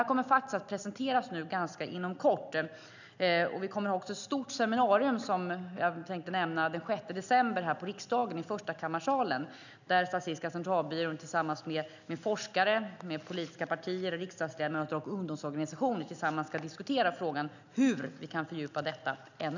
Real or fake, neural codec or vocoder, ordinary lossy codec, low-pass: real; none; none; 7.2 kHz